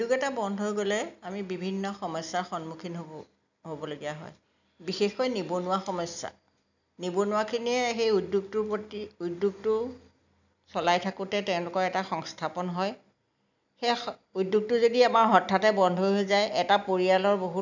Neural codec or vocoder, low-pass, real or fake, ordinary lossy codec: none; 7.2 kHz; real; none